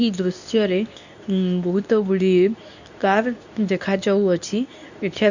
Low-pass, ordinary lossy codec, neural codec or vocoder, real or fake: 7.2 kHz; none; codec, 24 kHz, 0.9 kbps, WavTokenizer, medium speech release version 2; fake